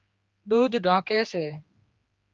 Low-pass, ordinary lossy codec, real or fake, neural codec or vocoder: 7.2 kHz; Opus, 24 kbps; fake; codec, 16 kHz, 2 kbps, X-Codec, HuBERT features, trained on general audio